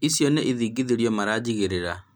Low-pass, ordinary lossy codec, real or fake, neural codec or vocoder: none; none; real; none